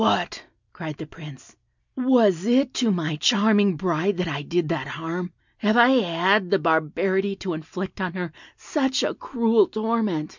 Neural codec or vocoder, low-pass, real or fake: none; 7.2 kHz; real